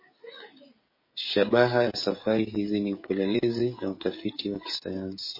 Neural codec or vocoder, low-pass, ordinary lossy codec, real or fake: codec, 16 kHz, 16 kbps, FreqCodec, smaller model; 5.4 kHz; MP3, 24 kbps; fake